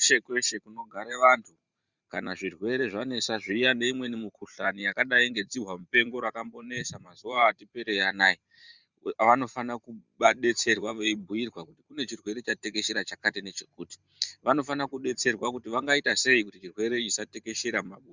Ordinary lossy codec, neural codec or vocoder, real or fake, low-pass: Opus, 64 kbps; vocoder, 44.1 kHz, 128 mel bands every 512 samples, BigVGAN v2; fake; 7.2 kHz